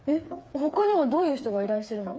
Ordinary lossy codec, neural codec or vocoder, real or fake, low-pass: none; codec, 16 kHz, 4 kbps, FreqCodec, smaller model; fake; none